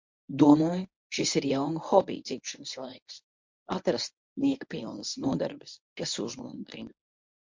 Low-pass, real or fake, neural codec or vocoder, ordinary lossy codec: 7.2 kHz; fake; codec, 24 kHz, 0.9 kbps, WavTokenizer, medium speech release version 1; MP3, 48 kbps